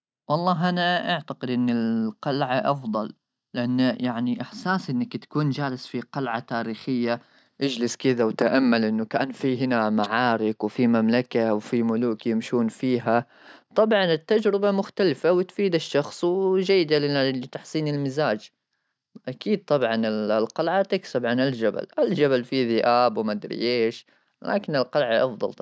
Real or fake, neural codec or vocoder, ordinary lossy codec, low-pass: real; none; none; none